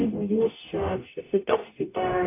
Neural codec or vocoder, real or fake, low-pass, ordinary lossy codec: codec, 44.1 kHz, 0.9 kbps, DAC; fake; 3.6 kHz; AAC, 24 kbps